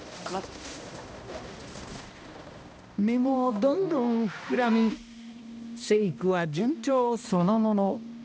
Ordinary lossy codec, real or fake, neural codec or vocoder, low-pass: none; fake; codec, 16 kHz, 1 kbps, X-Codec, HuBERT features, trained on balanced general audio; none